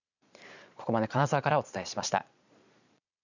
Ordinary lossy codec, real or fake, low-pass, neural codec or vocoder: none; fake; 7.2 kHz; vocoder, 22.05 kHz, 80 mel bands, Vocos